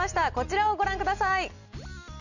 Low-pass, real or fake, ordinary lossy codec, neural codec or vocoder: 7.2 kHz; real; none; none